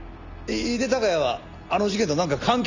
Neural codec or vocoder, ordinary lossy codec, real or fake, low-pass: none; none; real; 7.2 kHz